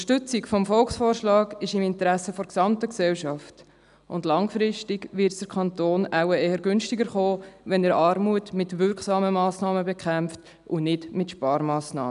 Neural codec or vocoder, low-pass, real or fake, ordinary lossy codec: none; 10.8 kHz; real; none